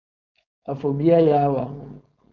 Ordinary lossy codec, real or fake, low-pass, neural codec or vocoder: MP3, 64 kbps; fake; 7.2 kHz; codec, 16 kHz, 4.8 kbps, FACodec